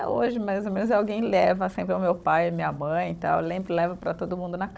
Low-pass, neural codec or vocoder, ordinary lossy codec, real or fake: none; codec, 16 kHz, 16 kbps, FunCodec, trained on Chinese and English, 50 frames a second; none; fake